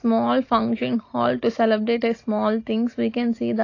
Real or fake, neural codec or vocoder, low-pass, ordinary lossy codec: real; none; 7.2 kHz; AAC, 32 kbps